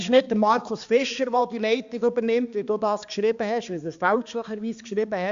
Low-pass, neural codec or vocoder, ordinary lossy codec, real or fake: 7.2 kHz; codec, 16 kHz, 2 kbps, X-Codec, HuBERT features, trained on balanced general audio; Opus, 64 kbps; fake